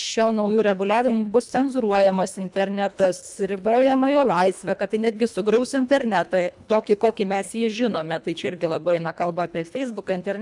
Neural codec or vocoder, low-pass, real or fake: codec, 24 kHz, 1.5 kbps, HILCodec; 10.8 kHz; fake